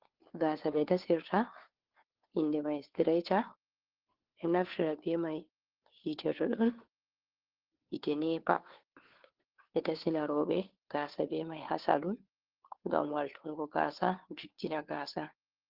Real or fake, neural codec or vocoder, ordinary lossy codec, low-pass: fake; codec, 16 kHz, 2 kbps, FunCodec, trained on Chinese and English, 25 frames a second; Opus, 16 kbps; 5.4 kHz